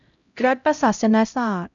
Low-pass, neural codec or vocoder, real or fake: 7.2 kHz; codec, 16 kHz, 0.5 kbps, X-Codec, HuBERT features, trained on LibriSpeech; fake